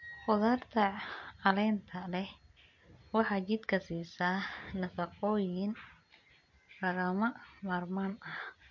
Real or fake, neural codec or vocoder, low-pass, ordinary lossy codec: real; none; 7.2 kHz; MP3, 48 kbps